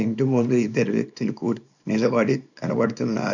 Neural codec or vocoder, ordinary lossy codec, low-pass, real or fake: codec, 24 kHz, 0.9 kbps, WavTokenizer, small release; none; 7.2 kHz; fake